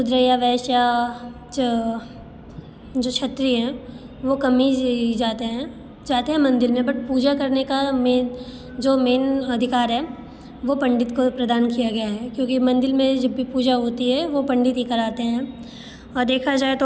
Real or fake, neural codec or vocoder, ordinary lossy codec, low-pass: real; none; none; none